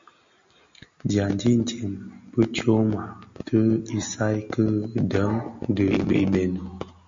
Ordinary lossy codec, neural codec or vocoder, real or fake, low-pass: MP3, 48 kbps; none; real; 7.2 kHz